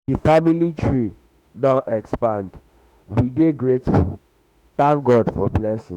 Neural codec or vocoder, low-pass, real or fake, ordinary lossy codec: autoencoder, 48 kHz, 32 numbers a frame, DAC-VAE, trained on Japanese speech; 19.8 kHz; fake; none